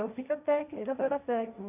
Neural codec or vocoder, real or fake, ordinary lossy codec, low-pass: codec, 16 kHz, 1.1 kbps, Voila-Tokenizer; fake; none; 3.6 kHz